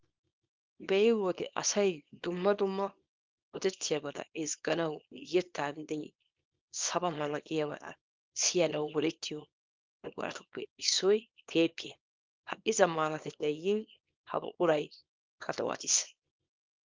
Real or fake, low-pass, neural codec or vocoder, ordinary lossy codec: fake; 7.2 kHz; codec, 24 kHz, 0.9 kbps, WavTokenizer, small release; Opus, 32 kbps